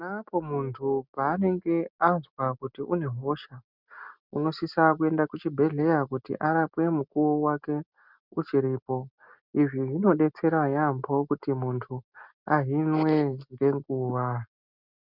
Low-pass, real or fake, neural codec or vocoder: 5.4 kHz; real; none